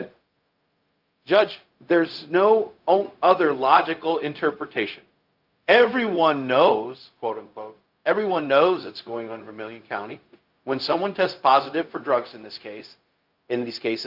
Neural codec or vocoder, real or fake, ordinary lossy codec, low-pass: codec, 16 kHz, 0.4 kbps, LongCat-Audio-Codec; fake; Opus, 24 kbps; 5.4 kHz